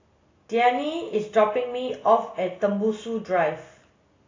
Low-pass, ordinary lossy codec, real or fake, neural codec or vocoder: 7.2 kHz; AAC, 32 kbps; real; none